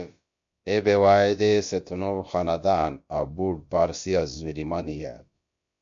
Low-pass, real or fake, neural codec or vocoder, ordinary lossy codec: 7.2 kHz; fake; codec, 16 kHz, about 1 kbps, DyCAST, with the encoder's durations; MP3, 48 kbps